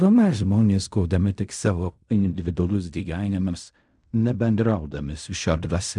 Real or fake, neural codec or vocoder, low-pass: fake; codec, 16 kHz in and 24 kHz out, 0.4 kbps, LongCat-Audio-Codec, fine tuned four codebook decoder; 10.8 kHz